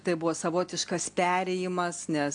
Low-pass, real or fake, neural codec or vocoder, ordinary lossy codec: 9.9 kHz; real; none; AAC, 64 kbps